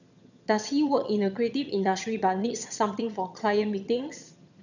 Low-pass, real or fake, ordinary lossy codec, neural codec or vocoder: 7.2 kHz; fake; none; vocoder, 22.05 kHz, 80 mel bands, HiFi-GAN